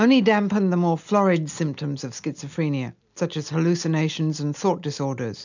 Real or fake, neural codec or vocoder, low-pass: real; none; 7.2 kHz